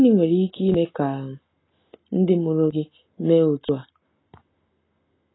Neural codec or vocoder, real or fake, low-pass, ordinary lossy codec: none; real; 7.2 kHz; AAC, 16 kbps